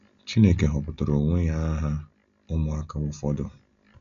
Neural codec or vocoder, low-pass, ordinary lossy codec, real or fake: none; 7.2 kHz; none; real